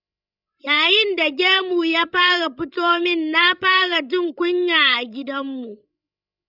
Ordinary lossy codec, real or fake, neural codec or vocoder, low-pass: none; fake; codec, 16 kHz, 16 kbps, FreqCodec, larger model; 5.4 kHz